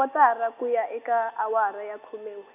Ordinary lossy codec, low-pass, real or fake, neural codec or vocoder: none; 3.6 kHz; real; none